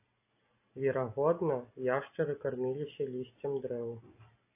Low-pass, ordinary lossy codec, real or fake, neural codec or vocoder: 3.6 kHz; AAC, 24 kbps; real; none